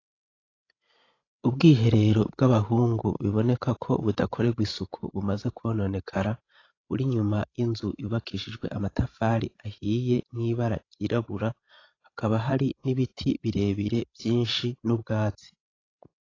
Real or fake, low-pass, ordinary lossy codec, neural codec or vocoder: fake; 7.2 kHz; AAC, 32 kbps; codec, 16 kHz, 16 kbps, FreqCodec, larger model